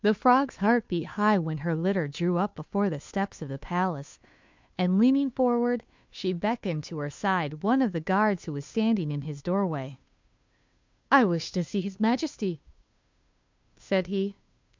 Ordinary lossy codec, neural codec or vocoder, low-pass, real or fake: MP3, 64 kbps; codec, 16 kHz, 2 kbps, FunCodec, trained on Chinese and English, 25 frames a second; 7.2 kHz; fake